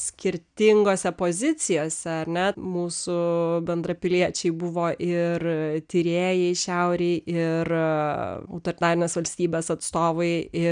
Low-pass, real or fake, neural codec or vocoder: 9.9 kHz; real; none